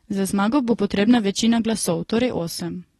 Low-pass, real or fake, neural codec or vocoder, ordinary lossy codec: 19.8 kHz; real; none; AAC, 32 kbps